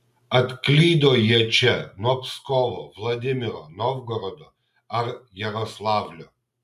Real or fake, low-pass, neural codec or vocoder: real; 14.4 kHz; none